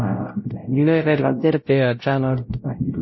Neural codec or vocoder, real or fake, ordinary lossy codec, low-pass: codec, 16 kHz, 0.5 kbps, X-Codec, WavLM features, trained on Multilingual LibriSpeech; fake; MP3, 24 kbps; 7.2 kHz